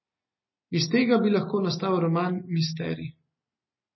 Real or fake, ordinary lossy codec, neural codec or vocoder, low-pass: real; MP3, 24 kbps; none; 7.2 kHz